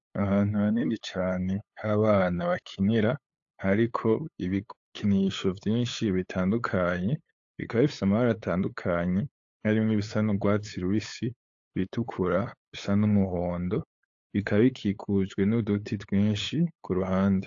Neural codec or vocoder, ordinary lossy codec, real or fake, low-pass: codec, 16 kHz, 8 kbps, FunCodec, trained on LibriTTS, 25 frames a second; AAC, 48 kbps; fake; 7.2 kHz